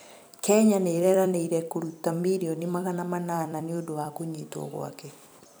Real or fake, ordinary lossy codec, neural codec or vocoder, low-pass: fake; none; vocoder, 44.1 kHz, 128 mel bands every 256 samples, BigVGAN v2; none